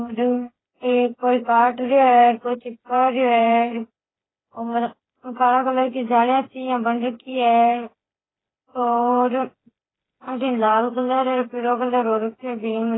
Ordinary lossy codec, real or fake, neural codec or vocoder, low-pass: AAC, 16 kbps; fake; codec, 16 kHz, 4 kbps, FreqCodec, smaller model; 7.2 kHz